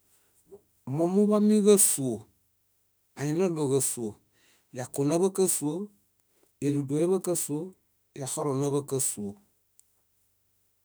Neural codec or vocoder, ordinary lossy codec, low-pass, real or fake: autoencoder, 48 kHz, 32 numbers a frame, DAC-VAE, trained on Japanese speech; none; none; fake